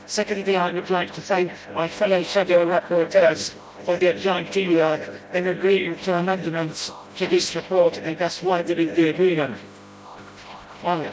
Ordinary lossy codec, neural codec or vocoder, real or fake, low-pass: none; codec, 16 kHz, 0.5 kbps, FreqCodec, smaller model; fake; none